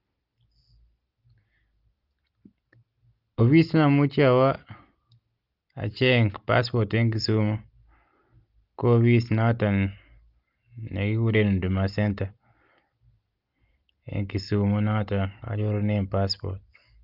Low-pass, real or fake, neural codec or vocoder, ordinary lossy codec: 5.4 kHz; real; none; Opus, 32 kbps